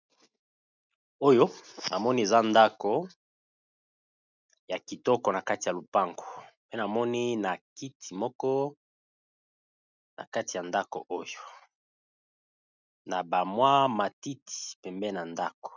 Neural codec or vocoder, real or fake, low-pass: none; real; 7.2 kHz